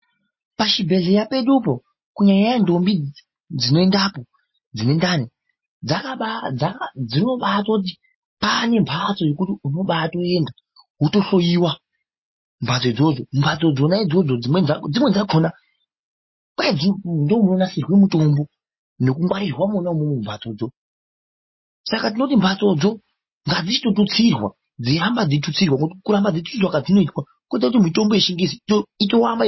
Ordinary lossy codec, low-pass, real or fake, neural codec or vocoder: MP3, 24 kbps; 7.2 kHz; real; none